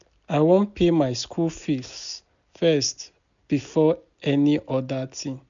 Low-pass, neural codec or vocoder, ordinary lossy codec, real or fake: 7.2 kHz; none; none; real